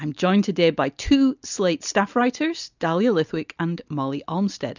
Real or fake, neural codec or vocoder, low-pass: real; none; 7.2 kHz